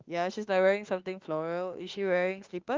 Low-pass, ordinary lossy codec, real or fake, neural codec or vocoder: 7.2 kHz; Opus, 32 kbps; fake; autoencoder, 48 kHz, 32 numbers a frame, DAC-VAE, trained on Japanese speech